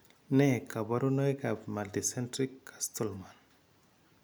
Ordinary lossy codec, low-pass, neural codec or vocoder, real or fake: none; none; none; real